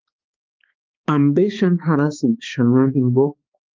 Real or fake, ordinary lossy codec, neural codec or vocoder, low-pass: fake; Opus, 24 kbps; codec, 16 kHz, 2 kbps, X-Codec, HuBERT features, trained on balanced general audio; 7.2 kHz